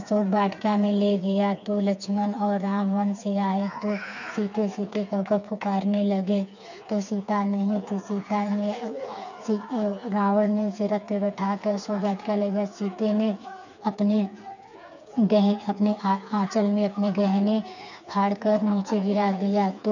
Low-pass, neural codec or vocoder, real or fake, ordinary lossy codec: 7.2 kHz; codec, 16 kHz, 4 kbps, FreqCodec, smaller model; fake; none